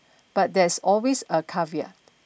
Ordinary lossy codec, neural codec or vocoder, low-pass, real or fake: none; none; none; real